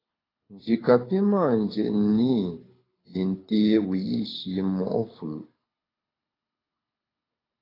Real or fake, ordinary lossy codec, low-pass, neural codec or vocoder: fake; AAC, 24 kbps; 5.4 kHz; codec, 24 kHz, 6 kbps, HILCodec